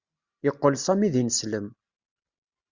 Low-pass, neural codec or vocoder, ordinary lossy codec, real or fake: 7.2 kHz; none; Opus, 64 kbps; real